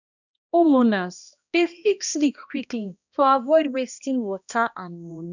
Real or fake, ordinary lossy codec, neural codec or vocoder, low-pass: fake; none; codec, 16 kHz, 1 kbps, X-Codec, HuBERT features, trained on balanced general audio; 7.2 kHz